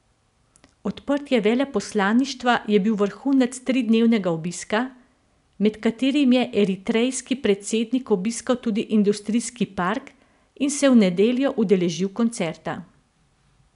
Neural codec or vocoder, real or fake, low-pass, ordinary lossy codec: none; real; 10.8 kHz; none